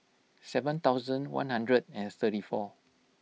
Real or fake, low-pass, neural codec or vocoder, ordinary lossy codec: real; none; none; none